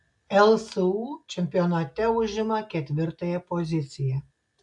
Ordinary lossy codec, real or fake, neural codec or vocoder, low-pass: AAC, 64 kbps; real; none; 10.8 kHz